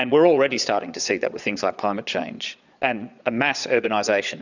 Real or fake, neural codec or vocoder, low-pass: fake; vocoder, 22.05 kHz, 80 mel bands, Vocos; 7.2 kHz